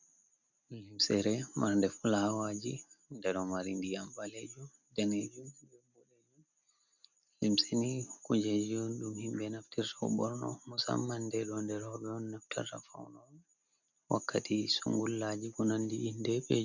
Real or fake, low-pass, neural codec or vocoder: real; 7.2 kHz; none